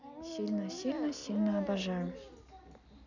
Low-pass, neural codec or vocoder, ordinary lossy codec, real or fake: 7.2 kHz; none; none; real